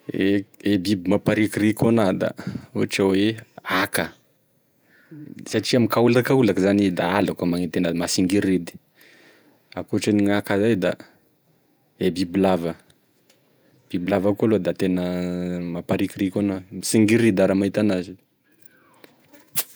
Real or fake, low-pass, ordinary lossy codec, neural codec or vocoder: fake; none; none; vocoder, 48 kHz, 128 mel bands, Vocos